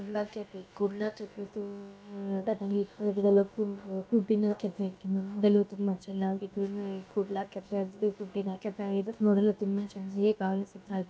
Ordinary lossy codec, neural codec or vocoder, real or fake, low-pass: none; codec, 16 kHz, about 1 kbps, DyCAST, with the encoder's durations; fake; none